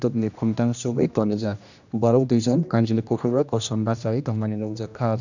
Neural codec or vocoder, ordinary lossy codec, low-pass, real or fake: codec, 16 kHz, 1 kbps, X-Codec, HuBERT features, trained on general audio; none; 7.2 kHz; fake